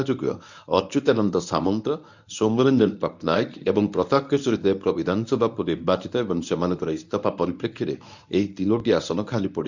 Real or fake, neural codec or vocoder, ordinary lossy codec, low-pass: fake; codec, 24 kHz, 0.9 kbps, WavTokenizer, medium speech release version 1; none; 7.2 kHz